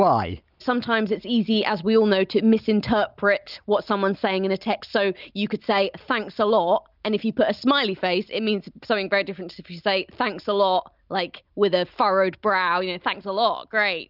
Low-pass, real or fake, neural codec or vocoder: 5.4 kHz; real; none